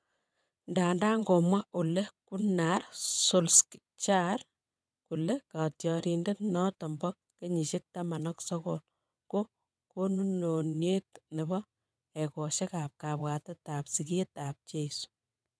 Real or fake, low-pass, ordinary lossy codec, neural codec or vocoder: fake; none; none; vocoder, 22.05 kHz, 80 mel bands, WaveNeXt